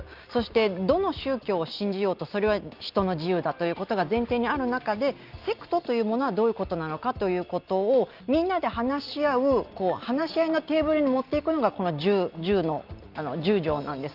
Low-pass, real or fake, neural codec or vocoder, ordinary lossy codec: 5.4 kHz; real; none; Opus, 24 kbps